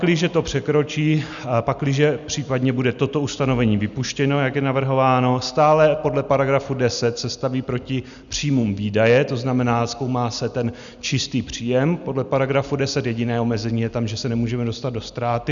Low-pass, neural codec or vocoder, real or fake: 7.2 kHz; none; real